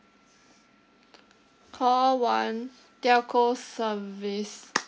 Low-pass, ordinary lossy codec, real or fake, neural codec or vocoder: none; none; real; none